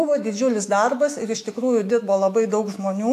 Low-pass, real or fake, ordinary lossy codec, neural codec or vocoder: 14.4 kHz; fake; AAC, 64 kbps; autoencoder, 48 kHz, 128 numbers a frame, DAC-VAE, trained on Japanese speech